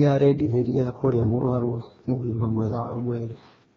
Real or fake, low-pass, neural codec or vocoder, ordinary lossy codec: fake; 7.2 kHz; codec, 16 kHz, 1 kbps, FreqCodec, larger model; AAC, 24 kbps